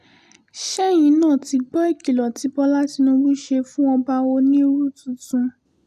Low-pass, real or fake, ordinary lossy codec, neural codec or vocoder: 14.4 kHz; real; none; none